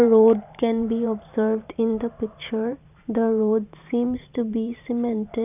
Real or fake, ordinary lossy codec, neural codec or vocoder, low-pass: fake; none; vocoder, 44.1 kHz, 128 mel bands every 512 samples, BigVGAN v2; 3.6 kHz